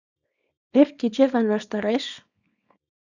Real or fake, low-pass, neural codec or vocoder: fake; 7.2 kHz; codec, 24 kHz, 0.9 kbps, WavTokenizer, small release